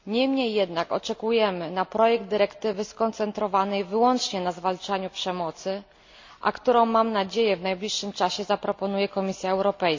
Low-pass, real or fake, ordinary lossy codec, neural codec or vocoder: 7.2 kHz; real; MP3, 48 kbps; none